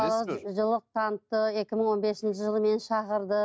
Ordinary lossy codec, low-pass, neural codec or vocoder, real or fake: none; none; none; real